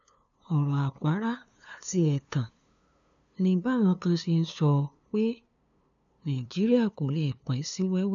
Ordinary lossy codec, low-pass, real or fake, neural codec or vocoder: none; 7.2 kHz; fake; codec, 16 kHz, 2 kbps, FunCodec, trained on LibriTTS, 25 frames a second